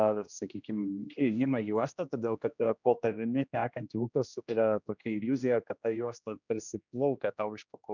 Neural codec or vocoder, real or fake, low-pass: codec, 16 kHz, 1 kbps, X-Codec, HuBERT features, trained on general audio; fake; 7.2 kHz